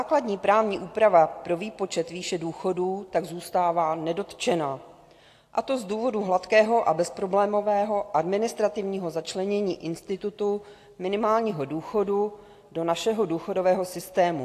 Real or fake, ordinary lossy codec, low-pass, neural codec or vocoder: real; AAC, 64 kbps; 14.4 kHz; none